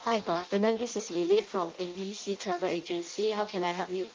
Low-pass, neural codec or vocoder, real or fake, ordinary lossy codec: 7.2 kHz; codec, 16 kHz in and 24 kHz out, 0.6 kbps, FireRedTTS-2 codec; fake; Opus, 24 kbps